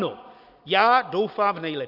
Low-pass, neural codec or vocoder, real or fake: 5.4 kHz; none; real